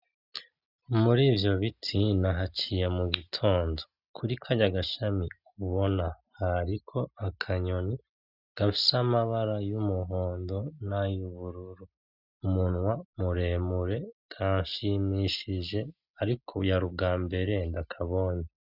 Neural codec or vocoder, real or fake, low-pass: none; real; 5.4 kHz